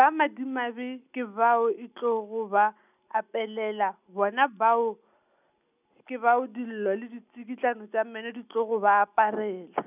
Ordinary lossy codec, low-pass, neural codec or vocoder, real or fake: none; 3.6 kHz; none; real